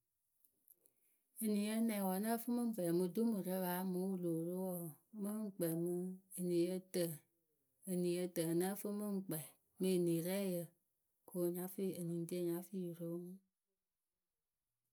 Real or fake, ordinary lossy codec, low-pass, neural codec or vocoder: real; none; none; none